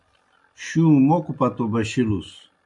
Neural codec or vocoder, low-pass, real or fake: none; 10.8 kHz; real